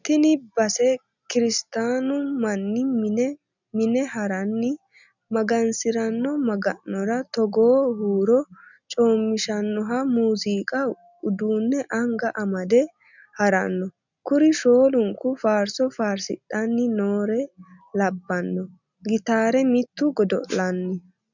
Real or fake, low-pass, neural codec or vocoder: real; 7.2 kHz; none